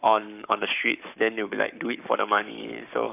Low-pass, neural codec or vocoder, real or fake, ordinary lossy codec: 3.6 kHz; codec, 44.1 kHz, 7.8 kbps, Pupu-Codec; fake; none